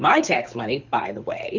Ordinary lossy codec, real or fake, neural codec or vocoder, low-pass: Opus, 64 kbps; fake; codec, 24 kHz, 6 kbps, HILCodec; 7.2 kHz